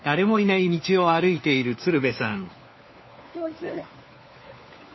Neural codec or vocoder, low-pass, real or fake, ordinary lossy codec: codec, 16 kHz, 4 kbps, FunCodec, trained on LibriTTS, 50 frames a second; 7.2 kHz; fake; MP3, 24 kbps